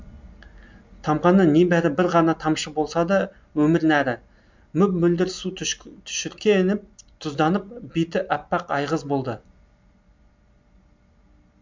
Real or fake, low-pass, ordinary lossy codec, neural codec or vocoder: real; 7.2 kHz; MP3, 64 kbps; none